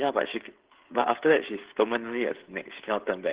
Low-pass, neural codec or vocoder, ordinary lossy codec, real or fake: 3.6 kHz; codec, 16 kHz, 16 kbps, FreqCodec, smaller model; Opus, 16 kbps; fake